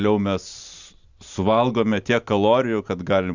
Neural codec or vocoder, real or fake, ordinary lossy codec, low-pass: none; real; Opus, 64 kbps; 7.2 kHz